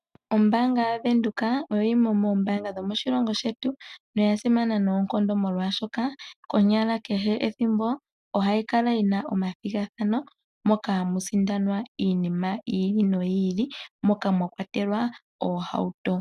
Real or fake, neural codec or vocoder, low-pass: real; none; 14.4 kHz